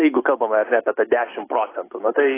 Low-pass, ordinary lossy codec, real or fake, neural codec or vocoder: 3.6 kHz; AAC, 16 kbps; real; none